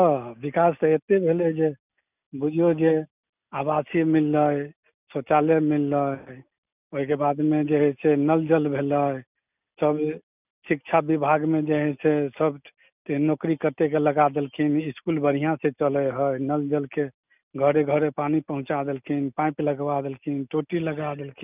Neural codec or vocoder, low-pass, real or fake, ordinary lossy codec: none; 3.6 kHz; real; none